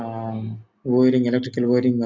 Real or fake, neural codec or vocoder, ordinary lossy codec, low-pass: real; none; MP3, 64 kbps; 7.2 kHz